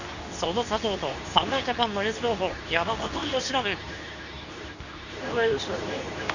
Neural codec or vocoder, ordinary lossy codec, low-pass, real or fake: codec, 24 kHz, 0.9 kbps, WavTokenizer, medium speech release version 2; none; 7.2 kHz; fake